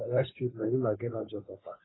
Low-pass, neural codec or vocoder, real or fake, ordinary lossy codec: 7.2 kHz; codec, 16 kHz, 2 kbps, FunCodec, trained on Chinese and English, 25 frames a second; fake; AAC, 16 kbps